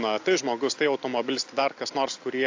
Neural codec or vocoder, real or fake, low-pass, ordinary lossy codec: none; real; 7.2 kHz; AAC, 48 kbps